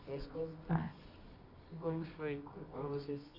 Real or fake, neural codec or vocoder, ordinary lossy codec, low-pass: fake; codec, 24 kHz, 0.9 kbps, WavTokenizer, medium music audio release; Opus, 64 kbps; 5.4 kHz